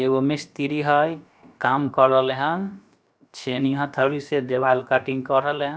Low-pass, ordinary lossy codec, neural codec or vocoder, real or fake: none; none; codec, 16 kHz, about 1 kbps, DyCAST, with the encoder's durations; fake